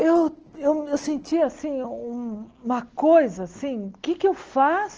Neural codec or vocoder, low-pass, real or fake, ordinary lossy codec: none; 7.2 kHz; real; Opus, 16 kbps